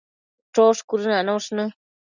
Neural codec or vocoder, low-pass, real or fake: none; 7.2 kHz; real